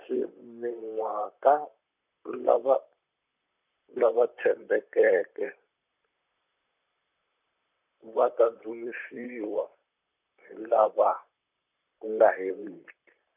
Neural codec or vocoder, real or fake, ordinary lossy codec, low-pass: vocoder, 44.1 kHz, 128 mel bands, Pupu-Vocoder; fake; none; 3.6 kHz